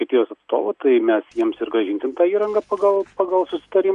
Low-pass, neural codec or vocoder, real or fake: 10.8 kHz; none; real